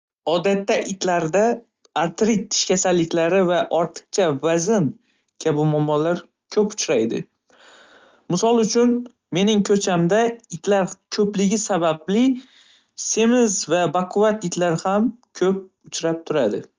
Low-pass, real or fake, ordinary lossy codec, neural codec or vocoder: 7.2 kHz; real; Opus, 32 kbps; none